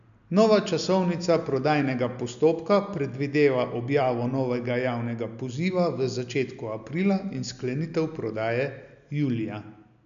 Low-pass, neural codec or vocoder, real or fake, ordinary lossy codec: 7.2 kHz; none; real; none